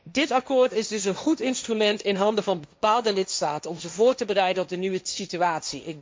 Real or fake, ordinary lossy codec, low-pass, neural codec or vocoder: fake; none; none; codec, 16 kHz, 1.1 kbps, Voila-Tokenizer